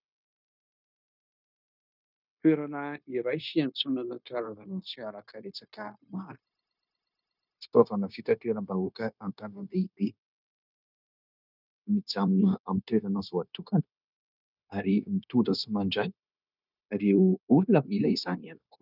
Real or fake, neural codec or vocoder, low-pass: fake; codec, 16 kHz, 0.9 kbps, LongCat-Audio-Codec; 5.4 kHz